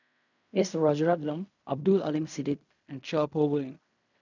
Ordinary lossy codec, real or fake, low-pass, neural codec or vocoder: none; fake; 7.2 kHz; codec, 16 kHz in and 24 kHz out, 0.4 kbps, LongCat-Audio-Codec, fine tuned four codebook decoder